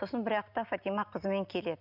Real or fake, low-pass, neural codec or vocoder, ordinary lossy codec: real; 5.4 kHz; none; none